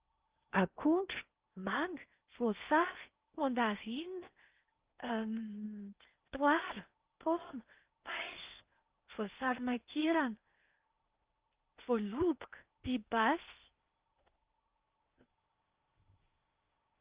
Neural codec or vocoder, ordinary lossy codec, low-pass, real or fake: codec, 16 kHz in and 24 kHz out, 0.8 kbps, FocalCodec, streaming, 65536 codes; Opus, 32 kbps; 3.6 kHz; fake